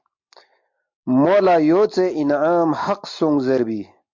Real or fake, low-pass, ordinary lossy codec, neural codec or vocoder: real; 7.2 kHz; MP3, 48 kbps; none